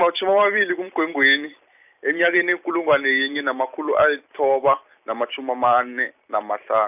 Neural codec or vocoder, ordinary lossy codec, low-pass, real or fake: none; none; 3.6 kHz; real